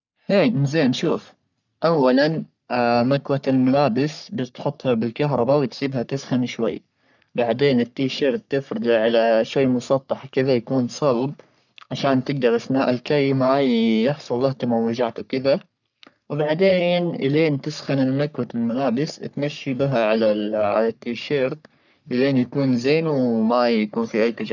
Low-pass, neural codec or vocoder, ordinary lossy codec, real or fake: 7.2 kHz; codec, 44.1 kHz, 3.4 kbps, Pupu-Codec; none; fake